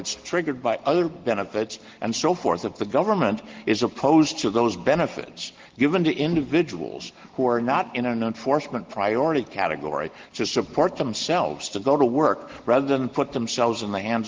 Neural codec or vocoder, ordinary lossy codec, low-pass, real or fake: none; Opus, 16 kbps; 7.2 kHz; real